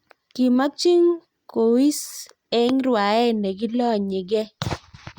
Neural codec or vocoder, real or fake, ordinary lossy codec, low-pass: none; real; Opus, 64 kbps; 19.8 kHz